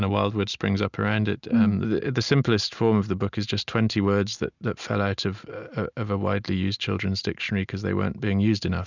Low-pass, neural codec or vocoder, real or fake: 7.2 kHz; none; real